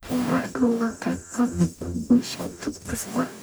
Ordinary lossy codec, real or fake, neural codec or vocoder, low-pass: none; fake; codec, 44.1 kHz, 0.9 kbps, DAC; none